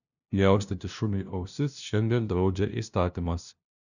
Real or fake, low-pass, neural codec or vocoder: fake; 7.2 kHz; codec, 16 kHz, 0.5 kbps, FunCodec, trained on LibriTTS, 25 frames a second